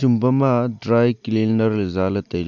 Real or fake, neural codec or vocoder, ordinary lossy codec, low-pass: real; none; none; 7.2 kHz